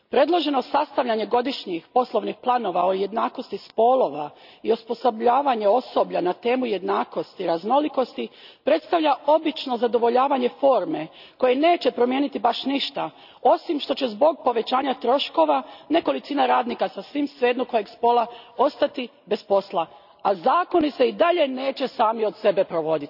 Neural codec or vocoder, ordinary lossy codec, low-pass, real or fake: none; none; 5.4 kHz; real